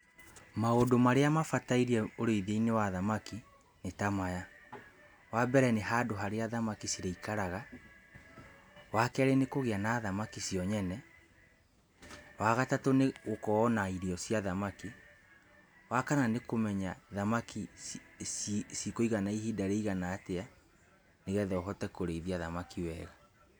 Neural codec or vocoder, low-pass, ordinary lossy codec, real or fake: none; none; none; real